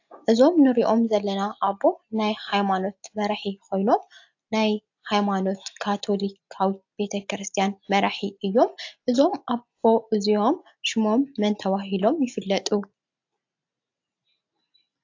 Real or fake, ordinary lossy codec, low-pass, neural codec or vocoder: real; AAC, 48 kbps; 7.2 kHz; none